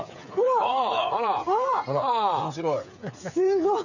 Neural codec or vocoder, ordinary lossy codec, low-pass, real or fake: codec, 16 kHz, 8 kbps, FreqCodec, smaller model; none; 7.2 kHz; fake